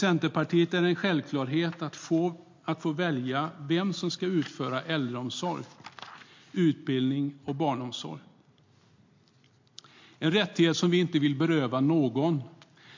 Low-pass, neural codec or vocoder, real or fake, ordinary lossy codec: 7.2 kHz; none; real; MP3, 48 kbps